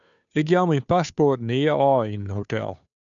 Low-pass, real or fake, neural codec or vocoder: 7.2 kHz; fake; codec, 16 kHz, 2 kbps, FunCodec, trained on Chinese and English, 25 frames a second